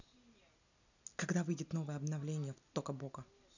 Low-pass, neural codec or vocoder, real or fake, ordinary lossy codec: 7.2 kHz; none; real; none